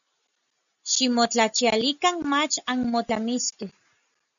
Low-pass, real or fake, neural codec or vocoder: 7.2 kHz; real; none